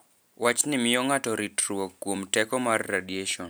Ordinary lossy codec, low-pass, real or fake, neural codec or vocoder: none; none; real; none